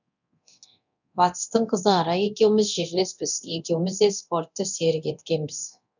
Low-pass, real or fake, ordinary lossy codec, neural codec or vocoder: 7.2 kHz; fake; none; codec, 24 kHz, 0.9 kbps, DualCodec